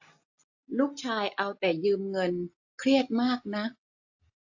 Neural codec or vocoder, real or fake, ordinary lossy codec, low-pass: none; real; AAC, 48 kbps; 7.2 kHz